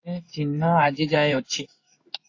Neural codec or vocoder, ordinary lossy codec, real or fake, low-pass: codec, 16 kHz in and 24 kHz out, 1 kbps, XY-Tokenizer; AAC, 48 kbps; fake; 7.2 kHz